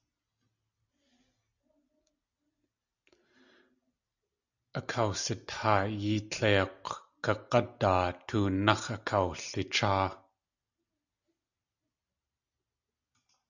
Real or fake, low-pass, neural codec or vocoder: real; 7.2 kHz; none